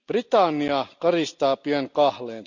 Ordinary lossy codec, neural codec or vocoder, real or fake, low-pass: none; none; real; 7.2 kHz